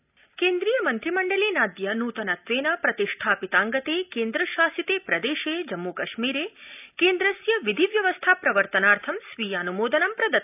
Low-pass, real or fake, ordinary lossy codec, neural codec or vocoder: 3.6 kHz; real; none; none